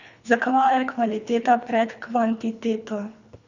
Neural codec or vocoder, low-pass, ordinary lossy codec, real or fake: codec, 24 kHz, 3 kbps, HILCodec; 7.2 kHz; none; fake